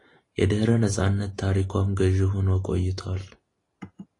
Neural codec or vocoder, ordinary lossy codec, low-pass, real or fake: none; AAC, 32 kbps; 10.8 kHz; real